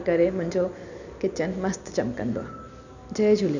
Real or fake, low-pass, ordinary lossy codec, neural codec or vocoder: real; 7.2 kHz; none; none